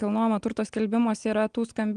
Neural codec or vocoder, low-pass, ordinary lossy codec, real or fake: none; 9.9 kHz; Opus, 24 kbps; real